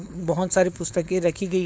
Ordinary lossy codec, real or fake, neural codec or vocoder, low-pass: none; fake; codec, 16 kHz, 4.8 kbps, FACodec; none